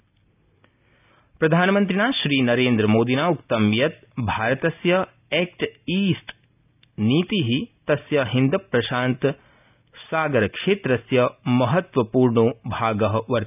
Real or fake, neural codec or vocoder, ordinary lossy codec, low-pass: real; none; none; 3.6 kHz